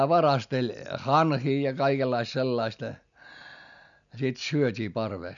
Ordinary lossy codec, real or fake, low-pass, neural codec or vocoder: none; real; 7.2 kHz; none